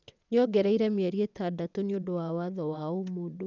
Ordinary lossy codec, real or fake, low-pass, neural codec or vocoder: none; fake; 7.2 kHz; vocoder, 22.05 kHz, 80 mel bands, WaveNeXt